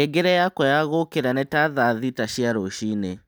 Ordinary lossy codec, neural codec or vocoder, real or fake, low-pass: none; none; real; none